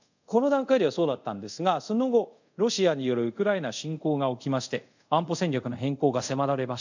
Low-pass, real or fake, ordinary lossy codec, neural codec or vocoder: 7.2 kHz; fake; none; codec, 24 kHz, 0.5 kbps, DualCodec